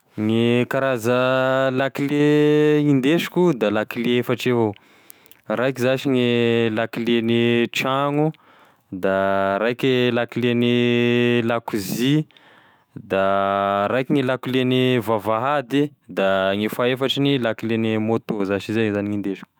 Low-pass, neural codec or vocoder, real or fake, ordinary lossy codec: none; autoencoder, 48 kHz, 128 numbers a frame, DAC-VAE, trained on Japanese speech; fake; none